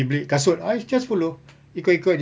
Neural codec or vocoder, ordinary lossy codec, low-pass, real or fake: none; none; none; real